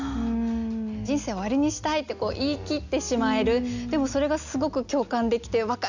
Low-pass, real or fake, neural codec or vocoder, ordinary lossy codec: 7.2 kHz; real; none; none